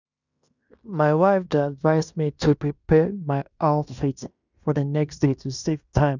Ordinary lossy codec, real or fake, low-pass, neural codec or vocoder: none; fake; 7.2 kHz; codec, 16 kHz in and 24 kHz out, 0.9 kbps, LongCat-Audio-Codec, fine tuned four codebook decoder